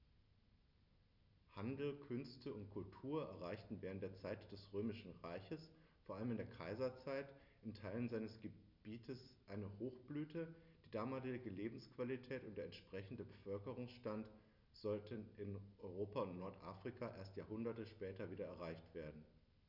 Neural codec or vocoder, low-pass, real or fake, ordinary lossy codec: none; 5.4 kHz; real; none